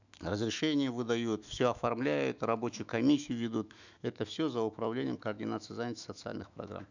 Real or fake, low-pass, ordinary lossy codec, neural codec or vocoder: fake; 7.2 kHz; none; autoencoder, 48 kHz, 128 numbers a frame, DAC-VAE, trained on Japanese speech